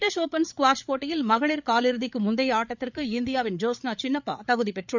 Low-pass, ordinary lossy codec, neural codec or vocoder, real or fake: 7.2 kHz; none; codec, 16 kHz, 8 kbps, FreqCodec, larger model; fake